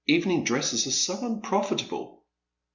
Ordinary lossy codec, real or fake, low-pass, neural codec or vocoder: Opus, 64 kbps; real; 7.2 kHz; none